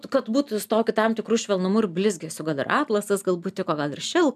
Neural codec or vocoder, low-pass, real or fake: none; 14.4 kHz; real